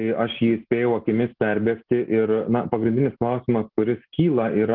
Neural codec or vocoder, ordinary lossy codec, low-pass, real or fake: none; Opus, 16 kbps; 5.4 kHz; real